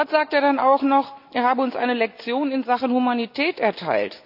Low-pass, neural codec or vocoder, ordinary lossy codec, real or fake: 5.4 kHz; none; none; real